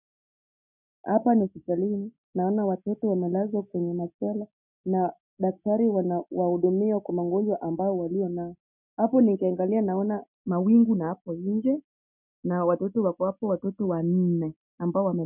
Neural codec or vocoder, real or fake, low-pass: none; real; 3.6 kHz